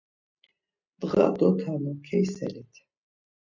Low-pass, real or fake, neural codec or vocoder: 7.2 kHz; real; none